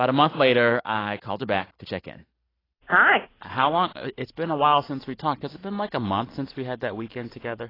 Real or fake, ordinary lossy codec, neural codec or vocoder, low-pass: real; AAC, 24 kbps; none; 5.4 kHz